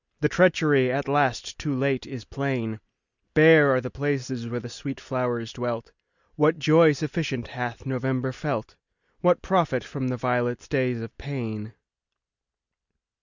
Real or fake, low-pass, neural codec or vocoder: real; 7.2 kHz; none